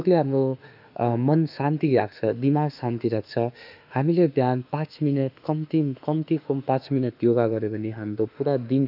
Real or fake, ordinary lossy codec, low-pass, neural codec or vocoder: fake; none; 5.4 kHz; autoencoder, 48 kHz, 32 numbers a frame, DAC-VAE, trained on Japanese speech